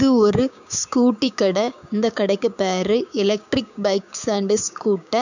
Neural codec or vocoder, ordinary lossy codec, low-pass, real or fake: codec, 16 kHz, 6 kbps, DAC; none; 7.2 kHz; fake